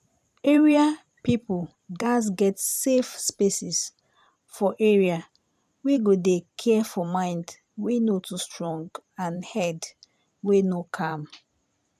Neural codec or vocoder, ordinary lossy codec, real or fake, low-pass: vocoder, 44.1 kHz, 128 mel bands every 512 samples, BigVGAN v2; none; fake; 14.4 kHz